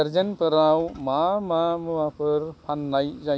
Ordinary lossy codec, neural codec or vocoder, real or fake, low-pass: none; none; real; none